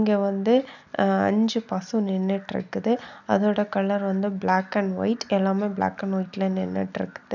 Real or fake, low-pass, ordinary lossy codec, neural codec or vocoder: real; 7.2 kHz; none; none